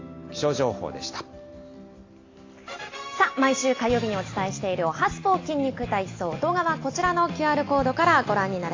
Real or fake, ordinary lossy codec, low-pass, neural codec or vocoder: real; AAC, 32 kbps; 7.2 kHz; none